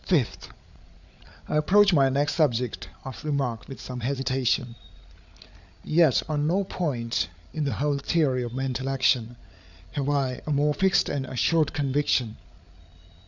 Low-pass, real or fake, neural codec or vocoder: 7.2 kHz; fake; codec, 16 kHz, 8 kbps, FreqCodec, larger model